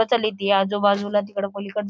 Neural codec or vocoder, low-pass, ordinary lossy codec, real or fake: none; none; none; real